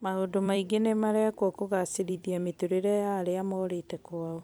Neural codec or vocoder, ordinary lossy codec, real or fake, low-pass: vocoder, 44.1 kHz, 128 mel bands every 256 samples, BigVGAN v2; none; fake; none